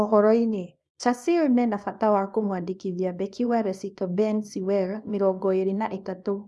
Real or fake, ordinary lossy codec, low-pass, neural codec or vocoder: fake; none; none; codec, 24 kHz, 0.9 kbps, WavTokenizer, small release